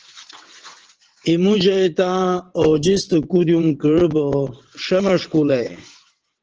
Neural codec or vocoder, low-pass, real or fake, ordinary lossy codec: vocoder, 22.05 kHz, 80 mel bands, WaveNeXt; 7.2 kHz; fake; Opus, 16 kbps